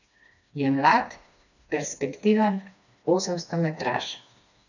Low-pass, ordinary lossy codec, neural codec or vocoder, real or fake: 7.2 kHz; none; codec, 16 kHz, 2 kbps, FreqCodec, smaller model; fake